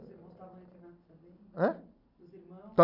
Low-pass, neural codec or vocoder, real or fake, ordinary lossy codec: 5.4 kHz; none; real; none